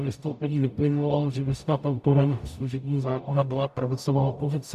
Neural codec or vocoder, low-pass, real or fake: codec, 44.1 kHz, 0.9 kbps, DAC; 14.4 kHz; fake